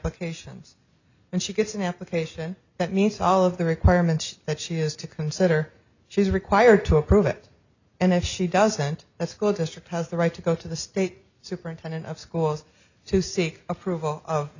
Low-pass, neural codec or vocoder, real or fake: 7.2 kHz; none; real